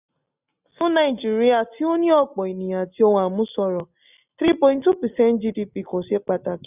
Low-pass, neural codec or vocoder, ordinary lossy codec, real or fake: 3.6 kHz; none; none; real